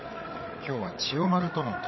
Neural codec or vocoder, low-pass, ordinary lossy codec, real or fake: codec, 16 kHz, 8 kbps, FreqCodec, larger model; 7.2 kHz; MP3, 24 kbps; fake